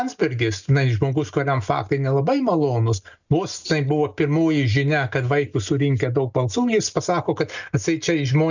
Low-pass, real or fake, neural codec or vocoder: 7.2 kHz; real; none